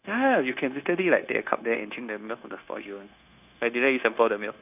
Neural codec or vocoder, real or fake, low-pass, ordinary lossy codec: codec, 16 kHz, 0.9 kbps, LongCat-Audio-Codec; fake; 3.6 kHz; none